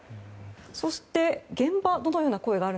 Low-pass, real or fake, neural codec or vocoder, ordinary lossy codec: none; real; none; none